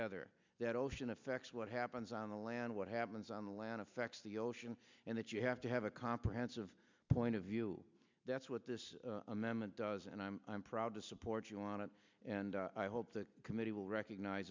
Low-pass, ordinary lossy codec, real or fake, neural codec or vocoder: 7.2 kHz; MP3, 64 kbps; real; none